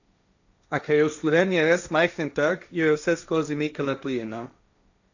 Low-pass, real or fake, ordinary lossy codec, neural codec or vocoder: 7.2 kHz; fake; none; codec, 16 kHz, 1.1 kbps, Voila-Tokenizer